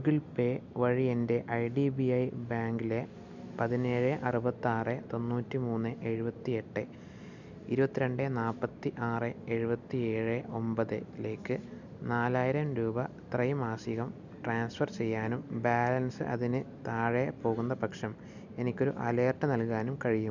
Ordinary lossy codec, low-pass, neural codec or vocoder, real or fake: none; 7.2 kHz; none; real